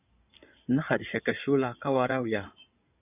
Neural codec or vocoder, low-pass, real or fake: codec, 16 kHz in and 24 kHz out, 2.2 kbps, FireRedTTS-2 codec; 3.6 kHz; fake